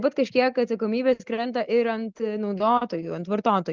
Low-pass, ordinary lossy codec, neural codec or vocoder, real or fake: 7.2 kHz; Opus, 24 kbps; none; real